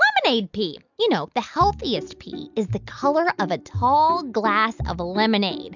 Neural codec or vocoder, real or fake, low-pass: none; real; 7.2 kHz